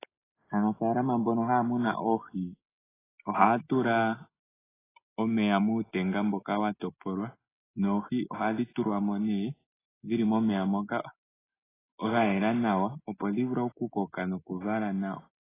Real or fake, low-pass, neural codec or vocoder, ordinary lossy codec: real; 3.6 kHz; none; AAC, 16 kbps